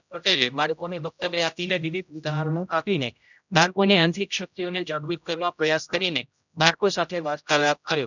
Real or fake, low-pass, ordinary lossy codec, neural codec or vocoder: fake; 7.2 kHz; none; codec, 16 kHz, 0.5 kbps, X-Codec, HuBERT features, trained on general audio